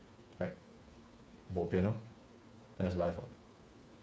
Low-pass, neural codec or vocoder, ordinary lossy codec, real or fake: none; codec, 16 kHz, 4 kbps, FreqCodec, smaller model; none; fake